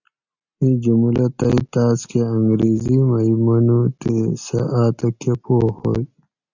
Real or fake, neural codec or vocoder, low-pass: real; none; 7.2 kHz